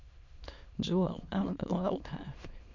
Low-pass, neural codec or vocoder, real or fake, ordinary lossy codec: 7.2 kHz; autoencoder, 22.05 kHz, a latent of 192 numbers a frame, VITS, trained on many speakers; fake; none